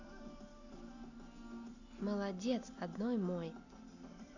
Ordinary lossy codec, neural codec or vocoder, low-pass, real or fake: none; none; 7.2 kHz; real